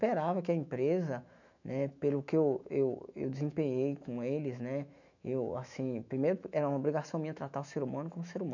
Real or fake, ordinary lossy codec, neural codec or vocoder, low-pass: fake; none; autoencoder, 48 kHz, 128 numbers a frame, DAC-VAE, trained on Japanese speech; 7.2 kHz